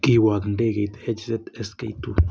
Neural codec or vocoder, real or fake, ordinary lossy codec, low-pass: none; real; none; none